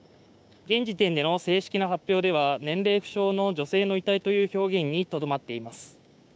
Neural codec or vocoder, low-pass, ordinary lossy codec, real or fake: codec, 16 kHz, 6 kbps, DAC; none; none; fake